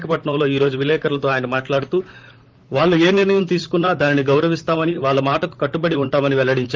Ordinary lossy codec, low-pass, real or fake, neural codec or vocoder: Opus, 32 kbps; 7.2 kHz; fake; vocoder, 44.1 kHz, 128 mel bands, Pupu-Vocoder